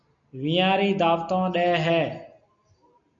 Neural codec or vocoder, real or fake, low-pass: none; real; 7.2 kHz